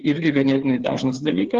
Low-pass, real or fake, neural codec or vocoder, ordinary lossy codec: 7.2 kHz; fake; codec, 16 kHz, 4 kbps, FreqCodec, larger model; Opus, 16 kbps